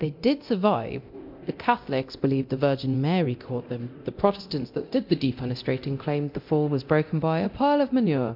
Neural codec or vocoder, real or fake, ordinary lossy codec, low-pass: codec, 24 kHz, 0.9 kbps, DualCodec; fake; MP3, 48 kbps; 5.4 kHz